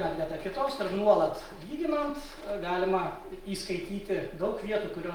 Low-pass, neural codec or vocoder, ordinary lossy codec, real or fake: 19.8 kHz; none; Opus, 24 kbps; real